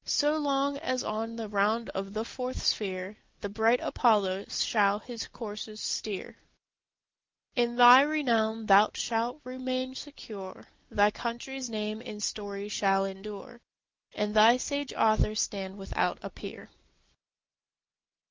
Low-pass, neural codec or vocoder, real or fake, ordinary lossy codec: 7.2 kHz; none; real; Opus, 24 kbps